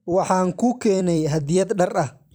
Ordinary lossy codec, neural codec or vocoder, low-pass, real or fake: none; vocoder, 44.1 kHz, 128 mel bands every 256 samples, BigVGAN v2; none; fake